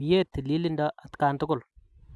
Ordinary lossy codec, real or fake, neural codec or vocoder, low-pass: none; real; none; none